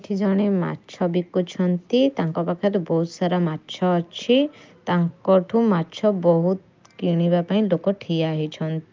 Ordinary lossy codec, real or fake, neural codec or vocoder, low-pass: Opus, 24 kbps; real; none; 7.2 kHz